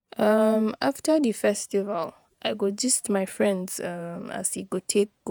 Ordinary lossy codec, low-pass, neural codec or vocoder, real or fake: none; none; vocoder, 48 kHz, 128 mel bands, Vocos; fake